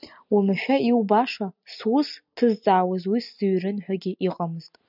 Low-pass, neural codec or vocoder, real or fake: 5.4 kHz; none; real